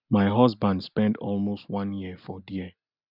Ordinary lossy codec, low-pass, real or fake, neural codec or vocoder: none; 5.4 kHz; real; none